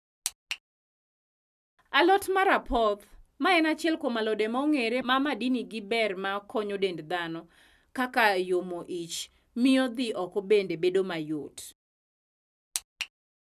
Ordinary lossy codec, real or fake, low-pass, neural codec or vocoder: none; real; 14.4 kHz; none